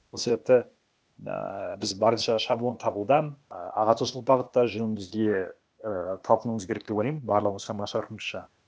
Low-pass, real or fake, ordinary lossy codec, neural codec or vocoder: none; fake; none; codec, 16 kHz, 0.8 kbps, ZipCodec